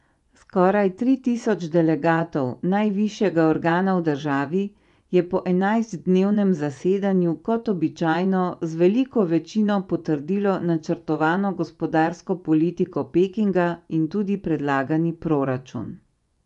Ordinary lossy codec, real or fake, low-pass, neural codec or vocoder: none; fake; 10.8 kHz; vocoder, 24 kHz, 100 mel bands, Vocos